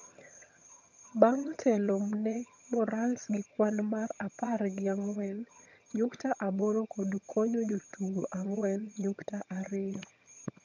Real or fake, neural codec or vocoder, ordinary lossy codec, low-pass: fake; vocoder, 22.05 kHz, 80 mel bands, HiFi-GAN; none; 7.2 kHz